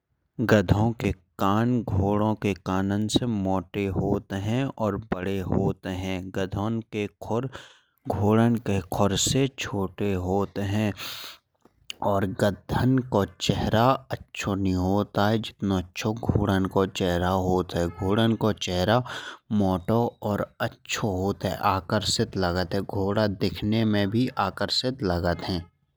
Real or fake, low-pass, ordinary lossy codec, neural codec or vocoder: real; none; none; none